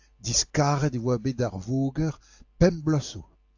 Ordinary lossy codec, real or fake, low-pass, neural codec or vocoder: AAC, 48 kbps; real; 7.2 kHz; none